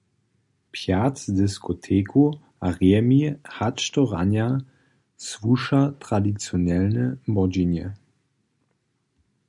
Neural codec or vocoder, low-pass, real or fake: none; 10.8 kHz; real